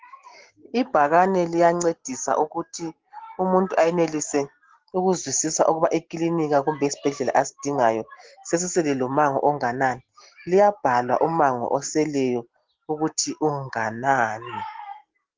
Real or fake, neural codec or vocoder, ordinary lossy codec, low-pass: real; none; Opus, 16 kbps; 7.2 kHz